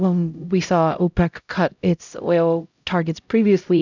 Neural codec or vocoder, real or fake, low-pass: codec, 16 kHz, 0.5 kbps, X-Codec, HuBERT features, trained on LibriSpeech; fake; 7.2 kHz